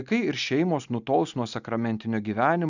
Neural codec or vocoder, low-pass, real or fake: none; 7.2 kHz; real